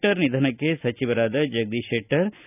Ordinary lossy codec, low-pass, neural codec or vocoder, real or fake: none; 3.6 kHz; none; real